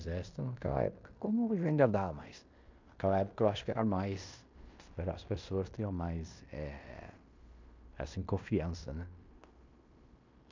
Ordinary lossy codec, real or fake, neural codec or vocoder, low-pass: MP3, 64 kbps; fake; codec, 16 kHz in and 24 kHz out, 0.9 kbps, LongCat-Audio-Codec, fine tuned four codebook decoder; 7.2 kHz